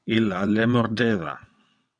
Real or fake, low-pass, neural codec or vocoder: fake; 9.9 kHz; vocoder, 22.05 kHz, 80 mel bands, WaveNeXt